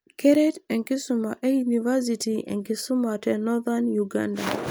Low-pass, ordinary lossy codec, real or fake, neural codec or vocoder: none; none; real; none